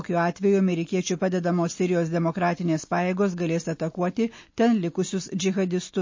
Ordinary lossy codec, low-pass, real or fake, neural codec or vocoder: MP3, 32 kbps; 7.2 kHz; real; none